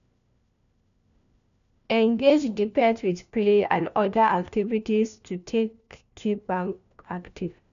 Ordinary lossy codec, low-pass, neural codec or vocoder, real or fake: none; 7.2 kHz; codec, 16 kHz, 1 kbps, FunCodec, trained on LibriTTS, 50 frames a second; fake